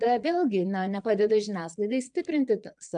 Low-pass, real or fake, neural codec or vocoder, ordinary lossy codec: 9.9 kHz; fake; vocoder, 22.05 kHz, 80 mel bands, Vocos; MP3, 96 kbps